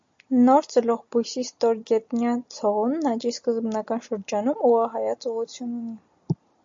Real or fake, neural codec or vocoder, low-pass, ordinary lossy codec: real; none; 7.2 kHz; MP3, 48 kbps